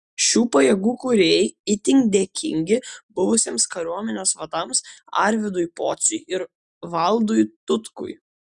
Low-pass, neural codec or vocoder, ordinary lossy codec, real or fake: 10.8 kHz; none; Opus, 64 kbps; real